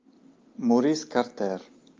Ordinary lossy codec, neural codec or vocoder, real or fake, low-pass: Opus, 24 kbps; none; real; 7.2 kHz